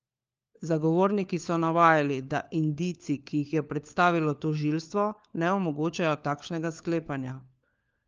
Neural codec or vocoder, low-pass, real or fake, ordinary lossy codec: codec, 16 kHz, 4 kbps, FunCodec, trained on LibriTTS, 50 frames a second; 7.2 kHz; fake; Opus, 24 kbps